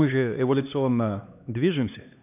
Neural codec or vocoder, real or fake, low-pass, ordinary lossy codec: codec, 16 kHz, 2 kbps, X-Codec, HuBERT features, trained on LibriSpeech; fake; 3.6 kHz; none